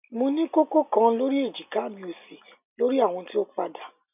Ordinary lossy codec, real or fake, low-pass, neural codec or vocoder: none; real; 3.6 kHz; none